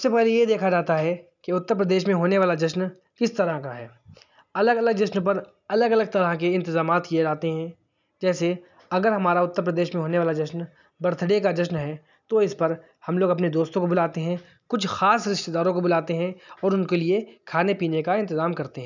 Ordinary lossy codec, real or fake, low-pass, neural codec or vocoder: none; real; 7.2 kHz; none